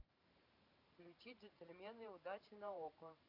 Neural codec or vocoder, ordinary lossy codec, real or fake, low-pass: vocoder, 44.1 kHz, 128 mel bands every 512 samples, BigVGAN v2; none; fake; 5.4 kHz